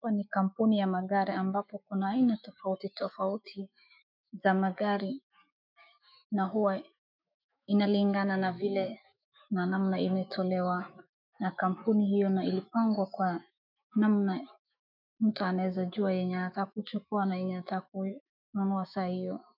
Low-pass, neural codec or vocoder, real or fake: 5.4 kHz; autoencoder, 48 kHz, 128 numbers a frame, DAC-VAE, trained on Japanese speech; fake